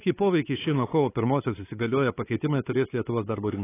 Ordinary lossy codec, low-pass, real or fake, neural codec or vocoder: AAC, 16 kbps; 3.6 kHz; fake; codec, 16 kHz, 8 kbps, FunCodec, trained on LibriTTS, 25 frames a second